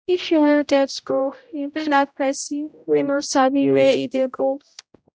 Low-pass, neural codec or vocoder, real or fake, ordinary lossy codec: none; codec, 16 kHz, 0.5 kbps, X-Codec, HuBERT features, trained on general audio; fake; none